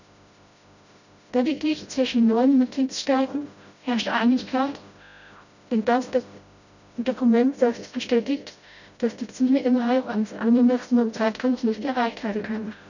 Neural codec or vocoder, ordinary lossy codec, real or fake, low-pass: codec, 16 kHz, 0.5 kbps, FreqCodec, smaller model; none; fake; 7.2 kHz